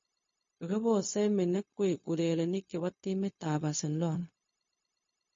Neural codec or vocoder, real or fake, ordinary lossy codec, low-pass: codec, 16 kHz, 0.4 kbps, LongCat-Audio-Codec; fake; MP3, 32 kbps; 7.2 kHz